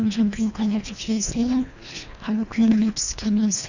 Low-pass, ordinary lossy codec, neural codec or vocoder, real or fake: 7.2 kHz; none; codec, 24 kHz, 1.5 kbps, HILCodec; fake